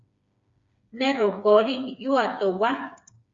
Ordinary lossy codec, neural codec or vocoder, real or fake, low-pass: Opus, 64 kbps; codec, 16 kHz, 4 kbps, FreqCodec, smaller model; fake; 7.2 kHz